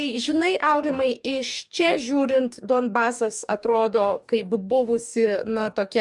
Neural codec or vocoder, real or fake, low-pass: codec, 44.1 kHz, 2.6 kbps, DAC; fake; 10.8 kHz